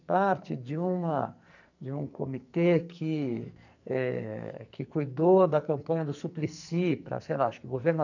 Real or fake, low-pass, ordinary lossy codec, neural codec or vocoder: fake; 7.2 kHz; none; codec, 44.1 kHz, 2.6 kbps, SNAC